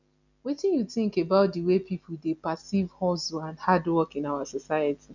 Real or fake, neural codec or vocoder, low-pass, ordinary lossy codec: real; none; 7.2 kHz; none